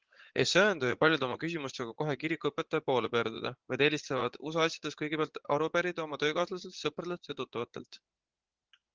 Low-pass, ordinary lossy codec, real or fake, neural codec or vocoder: 7.2 kHz; Opus, 16 kbps; fake; vocoder, 44.1 kHz, 80 mel bands, Vocos